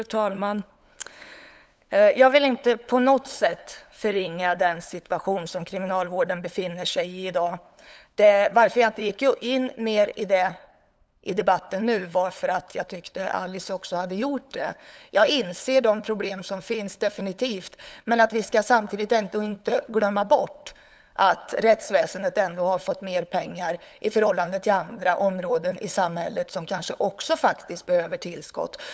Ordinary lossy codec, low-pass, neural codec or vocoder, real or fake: none; none; codec, 16 kHz, 8 kbps, FunCodec, trained on LibriTTS, 25 frames a second; fake